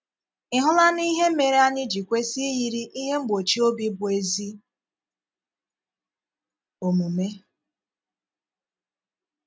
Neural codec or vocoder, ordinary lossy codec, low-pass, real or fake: none; none; none; real